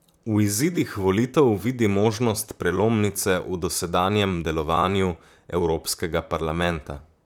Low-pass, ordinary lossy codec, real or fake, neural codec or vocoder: 19.8 kHz; none; fake; vocoder, 44.1 kHz, 128 mel bands, Pupu-Vocoder